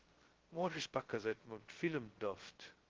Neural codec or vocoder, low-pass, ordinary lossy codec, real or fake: codec, 16 kHz, 0.2 kbps, FocalCodec; 7.2 kHz; Opus, 16 kbps; fake